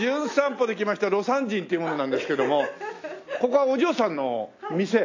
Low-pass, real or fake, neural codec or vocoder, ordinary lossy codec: 7.2 kHz; real; none; AAC, 48 kbps